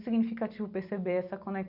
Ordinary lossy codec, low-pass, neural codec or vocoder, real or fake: none; 5.4 kHz; none; real